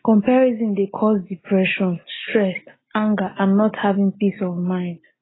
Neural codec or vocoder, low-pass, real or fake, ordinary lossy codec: none; 7.2 kHz; real; AAC, 16 kbps